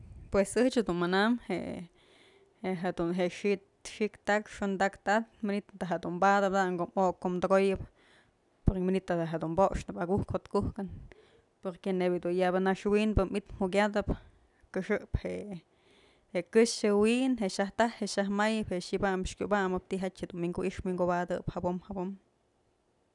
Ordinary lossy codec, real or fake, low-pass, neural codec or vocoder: none; real; 10.8 kHz; none